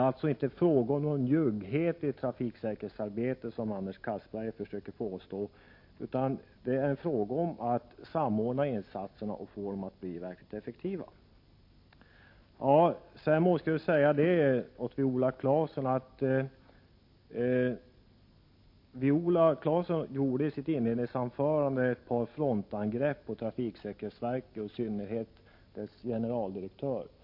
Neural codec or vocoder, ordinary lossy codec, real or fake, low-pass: none; MP3, 32 kbps; real; 5.4 kHz